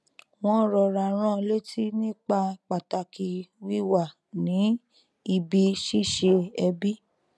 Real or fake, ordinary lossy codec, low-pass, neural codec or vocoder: real; none; none; none